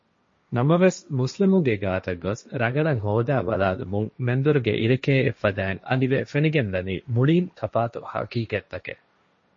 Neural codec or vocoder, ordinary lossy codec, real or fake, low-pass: codec, 16 kHz, 1.1 kbps, Voila-Tokenizer; MP3, 32 kbps; fake; 7.2 kHz